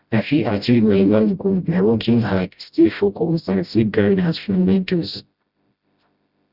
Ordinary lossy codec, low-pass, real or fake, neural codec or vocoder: Opus, 64 kbps; 5.4 kHz; fake; codec, 16 kHz, 0.5 kbps, FreqCodec, smaller model